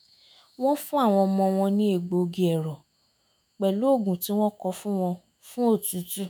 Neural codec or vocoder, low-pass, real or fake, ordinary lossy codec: autoencoder, 48 kHz, 128 numbers a frame, DAC-VAE, trained on Japanese speech; none; fake; none